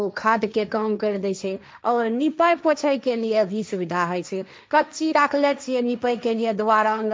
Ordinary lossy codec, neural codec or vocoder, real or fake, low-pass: none; codec, 16 kHz, 1.1 kbps, Voila-Tokenizer; fake; none